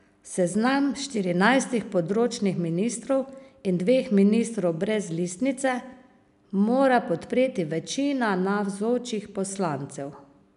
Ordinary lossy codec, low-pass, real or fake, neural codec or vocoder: none; 10.8 kHz; real; none